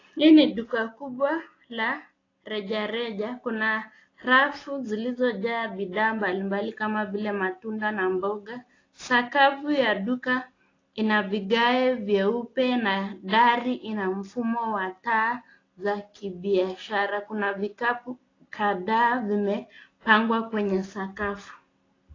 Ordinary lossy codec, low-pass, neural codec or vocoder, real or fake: AAC, 32 kbps; 7.2 kHz; none; real